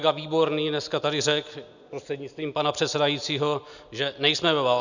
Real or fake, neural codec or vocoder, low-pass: real; none; 7.2 kHz